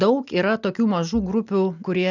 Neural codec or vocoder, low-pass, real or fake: none; 7.2 kHz; real